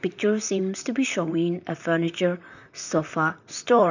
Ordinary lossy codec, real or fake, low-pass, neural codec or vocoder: none; fake; 7.2 kHz; vocoder, 44.1 kHz, 128 mel bands, Pupu-Vocoder